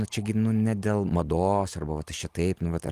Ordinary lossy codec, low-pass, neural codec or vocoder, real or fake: Opus, 24 kbps; 14.4 kHz; none; real